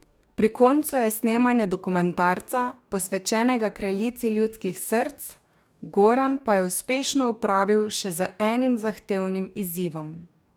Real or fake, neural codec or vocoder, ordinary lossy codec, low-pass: fake; codec, 44.1 kHz, 2.6 kbps, DAC; none; none